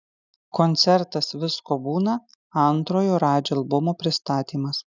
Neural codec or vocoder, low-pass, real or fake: none; 7.2 kHz; real